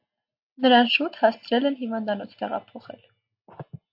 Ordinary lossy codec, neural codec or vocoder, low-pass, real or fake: AAC, 48 kbps; none; 5.4 kHz; real